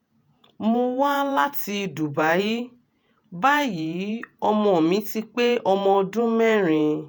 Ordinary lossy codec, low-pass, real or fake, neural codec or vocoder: none; none; fake; vocoder, 48 kHz, 128 mel bands, Vocos